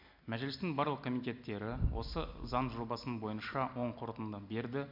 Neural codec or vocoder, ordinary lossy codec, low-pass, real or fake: none; MP3, 48 kbps; 5.4 kHz; real